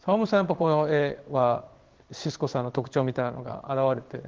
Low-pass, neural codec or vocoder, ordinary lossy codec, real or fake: 7.2 kHz; codec, 16 kHz in and 24 kHz out, 1 kbps, XY-Tokenizer; Opus, 16 kbps; fake